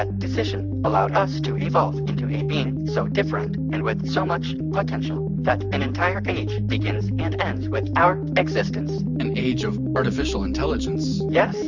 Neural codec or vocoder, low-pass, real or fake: none; 7.2 kHz; real